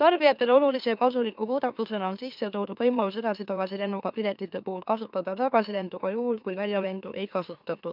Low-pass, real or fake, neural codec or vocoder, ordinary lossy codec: 5.4 kHz; fake; autoencoder, 44.1 kHz, a latent of 192 numbers a frame, MeloTTS; none